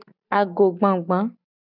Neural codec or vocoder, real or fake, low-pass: none; real; 5.4 kHz